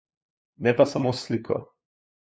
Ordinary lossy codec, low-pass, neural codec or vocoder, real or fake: none; none; codec, 16 kHz, 2 kbps, FunCodec, trained on LibriTTS, 25 frames a second; fake